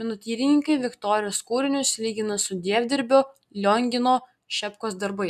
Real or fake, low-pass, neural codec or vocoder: real; 14.4 kHz; none